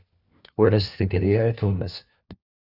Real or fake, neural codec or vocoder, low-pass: fake; codec, 16 kHz, 1 kbps, FunCodec, trained on LibriTTS, 50 frames a second; 5.4 kHz